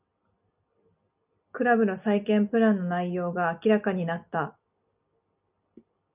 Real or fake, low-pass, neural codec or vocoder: real; 3.6 kHz; none